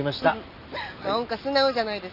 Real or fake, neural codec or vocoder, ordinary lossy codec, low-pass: real; none; none; 5.4 kHz